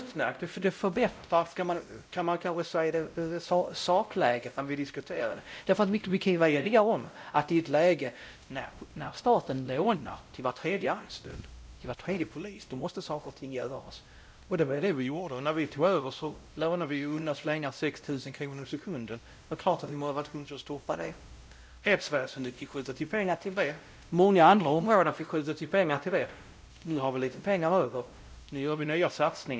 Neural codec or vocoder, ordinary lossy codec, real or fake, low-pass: codec, 16 kHz, 0.5 kbps, X-Codec, WavLM features, trained on Multilingual LibriSpeech; none; fake; none